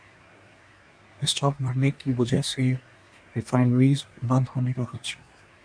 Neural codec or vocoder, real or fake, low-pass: codec, 24 kHz, 1 kbps, SNAC; fake; 9.9 kHz